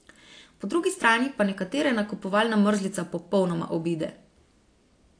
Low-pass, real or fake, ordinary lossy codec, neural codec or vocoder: 9.9 kHz; real; AAC, 48 kbps; none